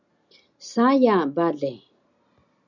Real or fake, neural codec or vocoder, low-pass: real; none; 7.2 kHz